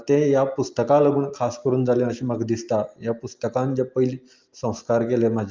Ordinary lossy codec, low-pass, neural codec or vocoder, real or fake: Opus, 32 kbps; 7.2 kHz; none; real